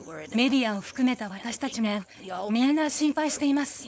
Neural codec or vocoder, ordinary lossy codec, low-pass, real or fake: codec, 16 kHz, 4.8 kbps, FACodec; none; none; fake